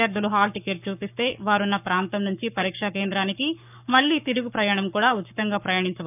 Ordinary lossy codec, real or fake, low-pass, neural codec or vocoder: none; fake; 3.6 kHz; codec, 44.1 kHz, 7.8 kbps, Pupu-Codec